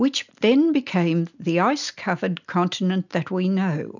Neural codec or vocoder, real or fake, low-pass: none; real; 7.2 kHz